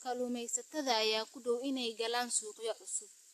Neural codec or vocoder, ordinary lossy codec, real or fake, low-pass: none; AAC, 64 kbps; real; 14.4 kHz